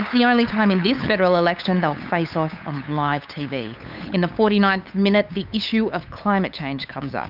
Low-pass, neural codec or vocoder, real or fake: 5.4 kHz; codec, 16 kHz, 8 kbps, FunCodec, trained on LibriTTS, 25 frames a second; fake